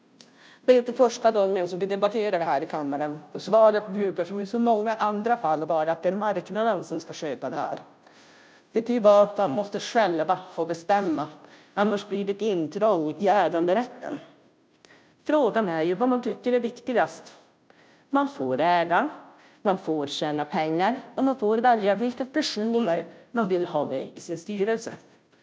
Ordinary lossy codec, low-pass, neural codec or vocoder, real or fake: none; none; codec, 16 kHz, 0.5 kbps, FunCodec, trained on Chinese and English, 25 frames a second; fake